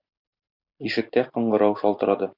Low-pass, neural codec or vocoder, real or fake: 5.4 kHz; vocoder, 44.1 kHz, 128 mel bands every 256 samples, BigVGAN v2; fake